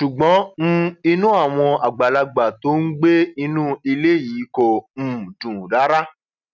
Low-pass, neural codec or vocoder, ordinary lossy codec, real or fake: 7.2 kHz; none; none; real